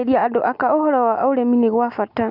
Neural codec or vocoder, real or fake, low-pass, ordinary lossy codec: none; real; 5.4 kHz; none